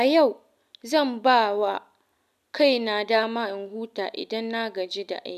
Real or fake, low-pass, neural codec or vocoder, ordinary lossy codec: real; 14.4 kHz; none; none